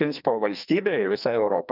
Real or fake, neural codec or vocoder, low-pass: fake; codec, 44.1 kHz, 2.6 kbps, SNAC; 5.4 kHz